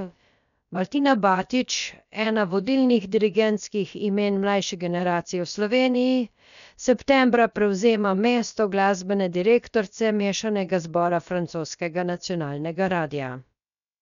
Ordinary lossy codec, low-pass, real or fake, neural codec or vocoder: none; 7.2 kHz; fake; codec, 16 kHz, about 1 kbps, DyCAST, with the encoder's durations